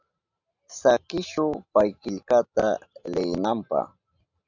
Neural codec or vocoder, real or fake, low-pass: none; real; 7.2 kHz